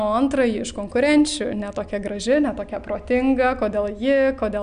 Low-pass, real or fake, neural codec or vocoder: 9.9 kHz; real; none